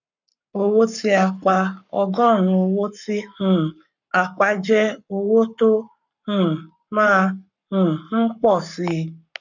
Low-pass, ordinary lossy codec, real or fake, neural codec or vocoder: 7.2 kHz; none; fake; codec, 44.1 kHz, 7.8 kbps, Pupu-Codec